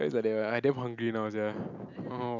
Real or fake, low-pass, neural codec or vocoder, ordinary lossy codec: real; 7.2 kHz; none; none